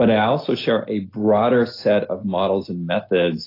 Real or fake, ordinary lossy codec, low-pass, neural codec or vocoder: real; AAC, 32 kbps; 5.4 kHz; none